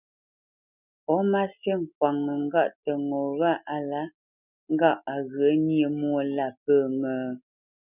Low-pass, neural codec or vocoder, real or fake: 3.6 kHz; none; real